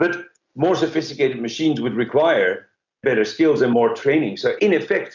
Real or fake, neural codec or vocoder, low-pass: real; none; 7.2 kHz